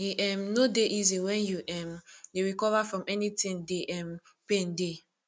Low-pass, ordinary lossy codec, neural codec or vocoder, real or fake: none; none; none; real